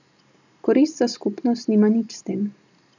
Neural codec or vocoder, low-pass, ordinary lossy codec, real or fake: none; 7.2 kHz; none; real